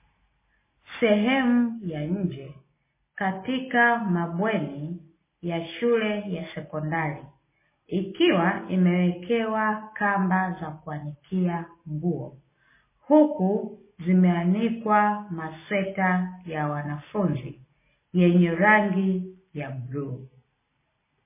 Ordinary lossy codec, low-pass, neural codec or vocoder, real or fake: MP3, 16 kbps; 3.6 kHz; none; real